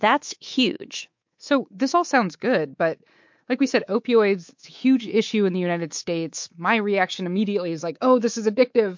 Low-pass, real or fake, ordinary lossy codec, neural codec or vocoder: 7.2 kHz; fake; MP3, 48 kbps; codec, 24 kHz, 3.1 kbps, DualCodec